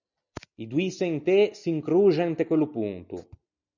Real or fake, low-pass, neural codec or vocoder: real; 7.2 kHz; none